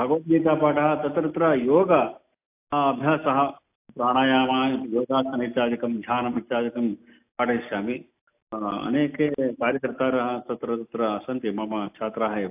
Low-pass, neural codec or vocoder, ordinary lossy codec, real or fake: 3.6 kHz; none; AAC, 32 kbps; real